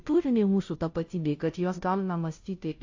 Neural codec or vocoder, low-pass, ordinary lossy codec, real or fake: codec, 16 kHz, 0.5 kbps, FunCodec, trained on Chinese and English, 25 frames a second; 7.2 kHz; AAC, 48 kbps; fake